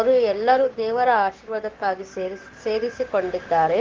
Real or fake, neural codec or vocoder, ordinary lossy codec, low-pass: fake; codec, 16 kHz, 6 kbps, DAC; Opus, 16 kbps; 7.2 kHz